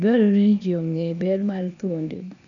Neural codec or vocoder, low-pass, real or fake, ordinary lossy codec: codec, 16 kHz, 0.8 kbps, ZipCodec; 7.2 kHz; fake; none